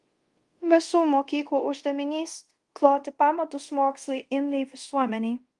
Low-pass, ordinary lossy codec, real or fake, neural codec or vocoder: 10.8 kHz; Opus, 24 kbps; fake; codec, 24 kHz, 0.5 kbps, DualCodec